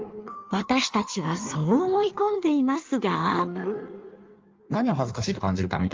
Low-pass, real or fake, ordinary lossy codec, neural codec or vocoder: 7.2 kHz; fake; Opus, 32 kbps; codec, 16 kHz in and 24 kHz out, 1.1 kbps, FireRedTTS-2 codec